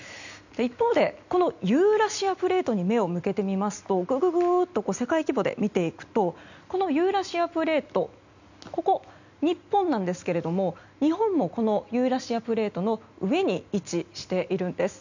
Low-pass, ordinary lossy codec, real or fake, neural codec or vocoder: 7.2 kHz; none; real; none